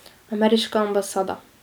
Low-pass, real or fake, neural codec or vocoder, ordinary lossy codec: none; real; none; none